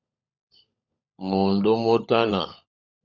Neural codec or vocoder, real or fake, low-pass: codec, 16 kHz, 16 kbps, FunCodec, trained on LibriTTS, 50 frames a second; fake; 7.2 kHz